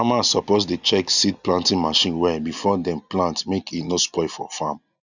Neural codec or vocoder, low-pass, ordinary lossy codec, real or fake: none; 7.2 kHz; none; real